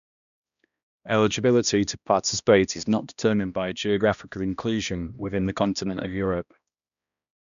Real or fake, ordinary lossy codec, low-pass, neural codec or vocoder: fake; none; 7.2 kHz; codec, 16 kHz, 1 kbps, X-Codec, HuBERT features, trained on balanced general audio